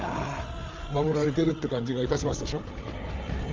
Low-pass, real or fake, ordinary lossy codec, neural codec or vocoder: 7.2 kHz; fake; Opus, 32 kbps; codec, 16 kHz, 8 kbps, FreqCodec, larger model